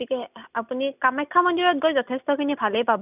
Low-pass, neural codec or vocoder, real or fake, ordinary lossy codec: 3.6 kHz; none; real; none